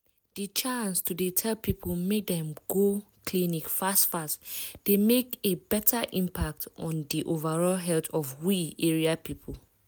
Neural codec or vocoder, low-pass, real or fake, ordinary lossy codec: none; none; real; none